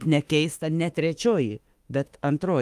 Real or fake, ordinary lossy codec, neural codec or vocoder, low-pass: fake; Opus, 32 kbps; autoencoder, 48 kHz, 32 numbers a frame, DAC-VAE, trained on Japanese speech; 14.4 kHz